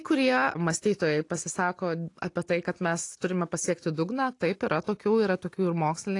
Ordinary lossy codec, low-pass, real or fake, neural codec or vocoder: AAC, 48 kbps; 10.8 kHz; real; none